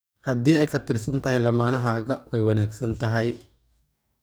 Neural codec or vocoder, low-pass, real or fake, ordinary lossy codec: codec, 44.1 kHz, 2.6 kbps, DAC; none; fake; none